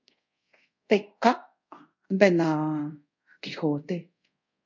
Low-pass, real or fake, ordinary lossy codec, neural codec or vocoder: 7.2 kHz; fake; MP3, 48 kbps; codec, 24 kHz, 0.5 kbps, DualCodec